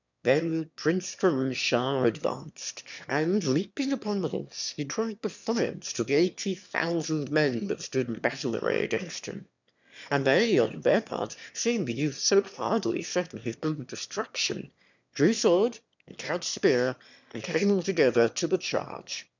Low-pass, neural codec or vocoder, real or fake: 7.2 kHz; autoencoder, 22.05 kHz, a latent of 192 numbers a frame, VITS, trained on one speaker; fake